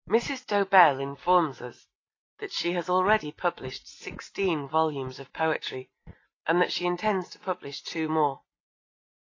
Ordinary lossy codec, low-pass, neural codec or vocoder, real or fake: AAC, 32 kbps; 7.2 kHz; none; real